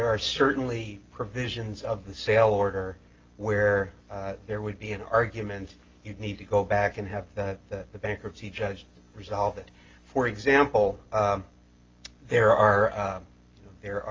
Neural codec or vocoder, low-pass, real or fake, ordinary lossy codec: none; 7.2 kHz; real; Opus, 32 kbps